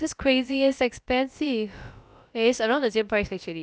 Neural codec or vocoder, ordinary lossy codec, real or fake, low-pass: codec, 16 kHz, about 1 kbps, DyCAST, with the encoder's durations; none; fake; none